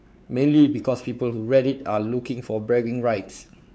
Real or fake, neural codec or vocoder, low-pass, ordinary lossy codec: fake; codec, 16 kHz, 4 kbps, X-Codec, WavLM features, trained on Multilingual LibriSpeech; none; none